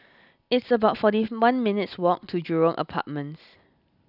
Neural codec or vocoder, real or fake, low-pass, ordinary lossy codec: none; real; 5.4 kHz; none